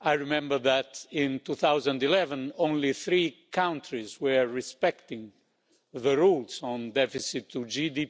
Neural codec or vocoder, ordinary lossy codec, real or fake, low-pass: none; none; real; none